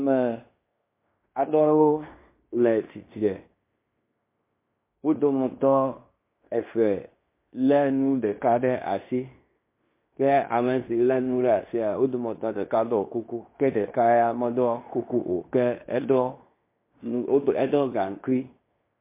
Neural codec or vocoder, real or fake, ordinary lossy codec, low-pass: codec, 16 kHz in and 24 kHz out, 0.9 kbps, LongCat-Audio-Codec, fine tuned four codebook decoder; fake; MP3, 24 kbps; 3.6 kHz